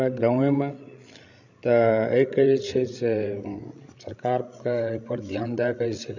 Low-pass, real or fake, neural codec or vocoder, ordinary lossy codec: 7.2 kHz; fake; codec, 16 kHz, 16 kbps, FreqCodec, larger model; none